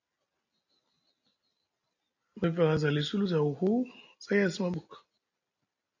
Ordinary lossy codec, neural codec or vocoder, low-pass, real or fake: AAC, 48 kbps; none; 7.2 kHz; real